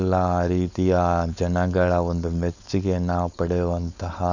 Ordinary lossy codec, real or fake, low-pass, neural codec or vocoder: none; fake; 7.2 kHz; codec, 16 kHz, 4.8 kbps, FACodec